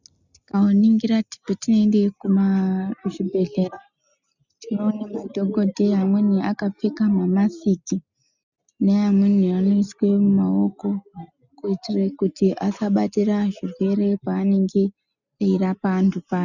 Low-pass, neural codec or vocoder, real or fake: 7.2 kHz; none; real